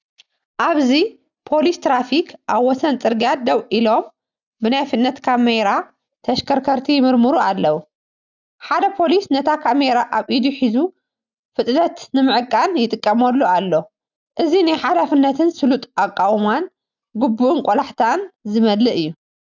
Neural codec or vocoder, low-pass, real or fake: none; 7.2 kHz; real